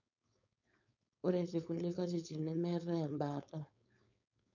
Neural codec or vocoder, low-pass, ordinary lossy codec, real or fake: codec, 16 kHz, 4.8 kbps, FACodec; 7.2 kHz; none; fake